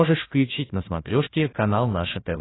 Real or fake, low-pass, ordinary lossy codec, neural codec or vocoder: fake; 7.2 kHz; AAC, 16 kbps; codec, 16 kHz, 1 kbps, FunCodec, trained on Chinese and English, 50 frames a second